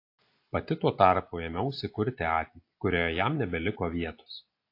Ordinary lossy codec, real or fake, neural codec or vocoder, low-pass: AAC, 32 kbps; real; none; 5.4 kHz